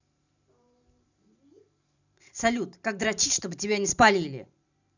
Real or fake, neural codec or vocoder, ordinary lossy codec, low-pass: fake; vocoder, 44.1 kHz, 128 mel bands every 512 samples, BigVGAN v2; none; 7.2 kHz